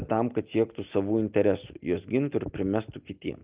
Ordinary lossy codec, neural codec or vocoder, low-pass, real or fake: Opus, 32 kbps; none; 3.6 kHz; real